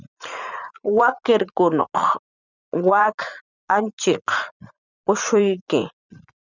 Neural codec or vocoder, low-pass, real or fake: vocoder, 44.1 kHz, 80 mel bands, Vocos; 7.2 kHz; fake